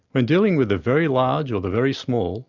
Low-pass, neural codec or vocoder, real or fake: 7.2 kHz; none; real